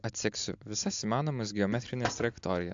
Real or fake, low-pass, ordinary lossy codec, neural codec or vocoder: real; 7.2 kHz; AAC, 64 kbps; none